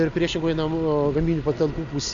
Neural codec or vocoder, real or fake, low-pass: none; real; 7.2 kHz